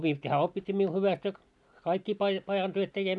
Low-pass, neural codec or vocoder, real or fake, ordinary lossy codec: 10.8 kHz; none; real; none